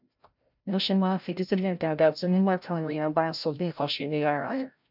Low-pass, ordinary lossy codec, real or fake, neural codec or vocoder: 5.4 kHz; none; fake; codec, 16 kHz, 0.5 kbps, FreqCodec, larger model